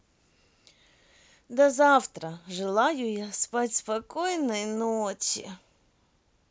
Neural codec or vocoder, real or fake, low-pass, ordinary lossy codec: none; real; none; none